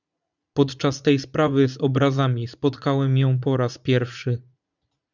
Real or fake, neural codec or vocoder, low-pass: fake; vocoder, 44.1 kHz, 80 mel bands, Vocos; 7.2 kHz